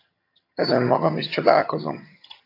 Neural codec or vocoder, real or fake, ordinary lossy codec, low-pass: vocoder, 22.05 kHz, 80 mel bands, HiFi-GAN; fake; AAC, 32 kbps; 5.4 kHz